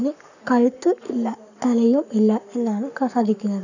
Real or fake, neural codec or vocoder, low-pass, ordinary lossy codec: fake; codec, 44.1 kHz, 7.8 kbps, Pupu-Codec; 7.2 kHz; none